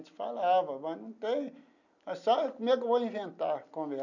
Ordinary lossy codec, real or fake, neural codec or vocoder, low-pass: none; real; none; 7.2 kHz